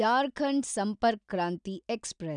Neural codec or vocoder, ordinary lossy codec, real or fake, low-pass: none; none; real; 9.9 kHz